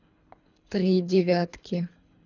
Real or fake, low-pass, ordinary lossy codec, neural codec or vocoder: fake; 7.2 kHz; none; codec, 24 kHz, 3 kbps, HILCodec